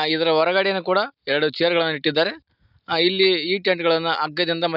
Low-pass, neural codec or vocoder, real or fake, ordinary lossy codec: 5.4 kHz; none; real; none